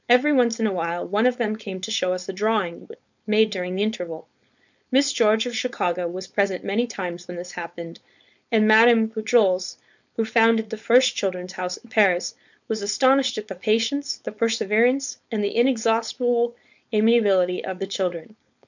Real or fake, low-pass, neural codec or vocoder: fake; 7.2 kHz; codec, 16 kHz, 4.8 kbps, FACodec